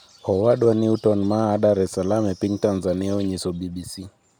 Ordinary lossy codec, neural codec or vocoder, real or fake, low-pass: none; none; real; none